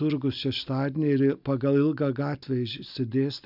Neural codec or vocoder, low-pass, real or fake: none; 5.4 kHz; real